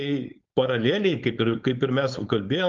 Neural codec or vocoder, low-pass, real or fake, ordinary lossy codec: codec, 16 kHz, 4.8 kbps, FACodec; 7.2 kHz; fake; Opus, 24 kbps